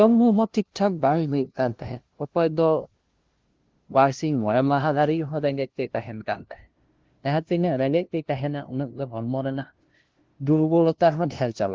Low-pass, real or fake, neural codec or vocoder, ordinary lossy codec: 7.2 kHz; fake; codec, 16 kHz, 0.5 kbps, FunCodec, trained on LibriTTS, 25 frames a second; Opus, 16 kbps